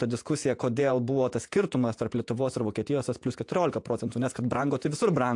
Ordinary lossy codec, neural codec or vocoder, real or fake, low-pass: AAC, 64 kbps; vocoder, 48 kHz, 128 mel bands, Vocos; fake; 10.8 kHz